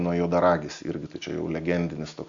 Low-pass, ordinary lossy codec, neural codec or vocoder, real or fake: 7.2 kHz; Opus, 64 kbps; none; real